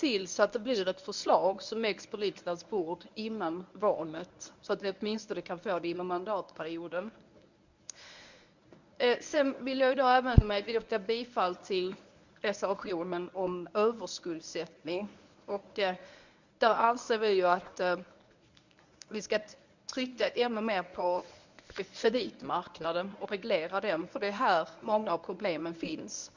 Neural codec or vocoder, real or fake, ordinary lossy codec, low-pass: codec, 24 kHz, 0.9 kbps, WavTokenizer, medium speech release version 1; fake; none; 7.2 kHz